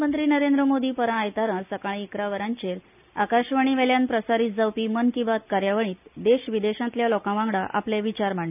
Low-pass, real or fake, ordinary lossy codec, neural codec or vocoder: 3.6 kHz; real; none; none